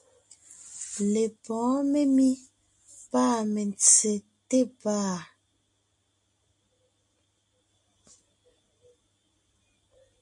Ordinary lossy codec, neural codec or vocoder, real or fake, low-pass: MP3, 48 kbps; none; real; 10.8 kHz